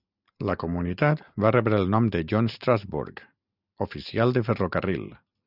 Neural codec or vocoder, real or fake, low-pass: none; real; 5.4 kHz